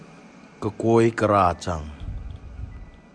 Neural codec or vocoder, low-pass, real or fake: none; 9.9 kHz; real